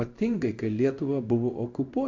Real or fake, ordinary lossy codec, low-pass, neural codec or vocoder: fake; MP3, 64 kbps; 7.2 kHz; codec, 16 kHz in and 24 kHz out, 1 kbps, XY-Tokenizer